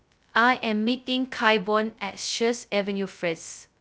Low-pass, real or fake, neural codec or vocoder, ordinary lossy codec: none; fake; codec, 16 kHz, 0.2 kbps, FocalCodec; none